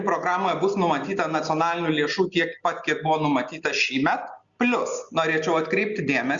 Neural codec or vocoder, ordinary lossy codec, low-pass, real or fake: none; Opus, 64 kbps; 7.2 kHz; real